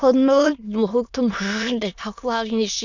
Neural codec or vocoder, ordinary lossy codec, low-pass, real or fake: autoencoder, 22.05 kHz, a latent of 192 numbers a frame, VITS, trained on many speakers; none; 7.2 kHz; fake